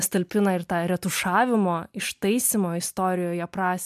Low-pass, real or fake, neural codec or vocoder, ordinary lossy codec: 14.4 kHz; real; none; MP3, 96 kbps